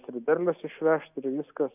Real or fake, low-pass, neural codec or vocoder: real; 3.6 kHz; none